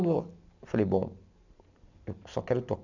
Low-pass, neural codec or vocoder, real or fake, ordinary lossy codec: 7.2 kHz; none; real; none